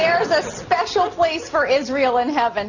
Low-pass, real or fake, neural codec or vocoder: 7.2 kHz; real; none